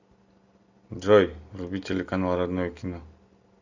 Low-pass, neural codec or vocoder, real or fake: 7.2 kHz; none; real